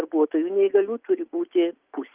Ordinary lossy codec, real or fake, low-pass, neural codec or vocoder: Opus, 24 kbps; real; 3.6 kHz; none